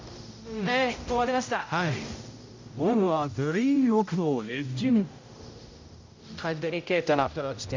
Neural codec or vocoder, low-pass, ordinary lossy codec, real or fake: codec, 16 kHz, 0.5 kbps, X-Codec, HuBERT features, trained on general audio; 7.2 kHz; MP3, 64 kbps; fake